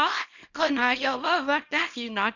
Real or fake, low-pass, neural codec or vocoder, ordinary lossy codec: fake; 7.2 kHz; codec, 24 kHz, 0.9 kbps, WavTokenizer, small release; none